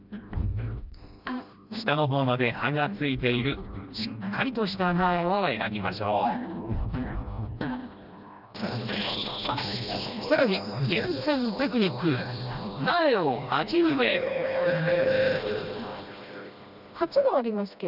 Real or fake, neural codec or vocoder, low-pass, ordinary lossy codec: fake; codec, 16 kHz, 1 kbps, FreqCodec, smaller model; 5.4 kHz; none